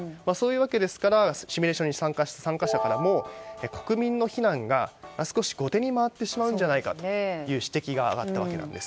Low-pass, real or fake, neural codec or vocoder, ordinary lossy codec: none; real; none; none